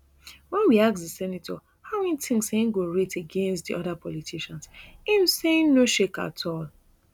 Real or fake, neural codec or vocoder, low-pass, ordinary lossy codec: real; none; 19.8 kHz; none